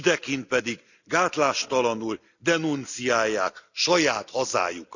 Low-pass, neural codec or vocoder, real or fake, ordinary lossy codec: 7.2 kHz; none; real; none